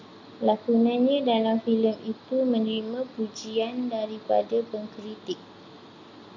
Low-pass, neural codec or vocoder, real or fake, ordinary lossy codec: 7.2 kHz; none; real; MP3, 64 kbps